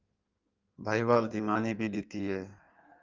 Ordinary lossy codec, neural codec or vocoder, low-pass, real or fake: Opus, 24 kbps; codec, 16 kHz in and 24 kHz out, 1.1 kbps, FireRedTTS-2 codec; 7.2 kHz; fake